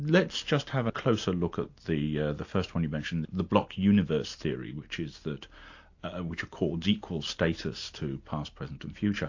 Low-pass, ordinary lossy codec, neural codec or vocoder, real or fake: 7.2 kHz; AAC, 48 kbps; none; real